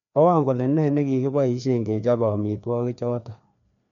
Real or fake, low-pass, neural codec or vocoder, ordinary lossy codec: fake; 7.2 kHz; codec, 16 kHz, 2 kbps, FreqCodec, larger model; none